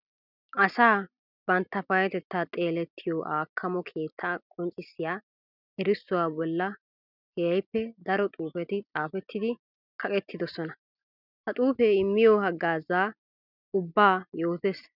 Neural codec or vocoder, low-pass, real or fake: none; 5.4 kHz; real